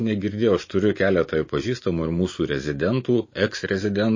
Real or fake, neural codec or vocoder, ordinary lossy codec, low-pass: fake; vocoder, 44.1 kHz, 128 mel bands every 512 samples, BigVGAN v2; MP3, 32 kbps; 7.2 kHz